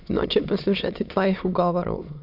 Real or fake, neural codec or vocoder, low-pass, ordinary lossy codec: fake; autoencoder, 22.05 kHz, a latent of 192 numbers a frame, VITS, trained on many speakers; 5.4 kHz; none